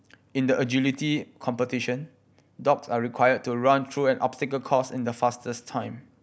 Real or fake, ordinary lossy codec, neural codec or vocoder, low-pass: real; none; none; none